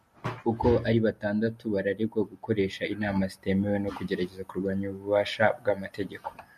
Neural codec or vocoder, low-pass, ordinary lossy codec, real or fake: none; 14.4 kHz; MP3, 64 kbps; real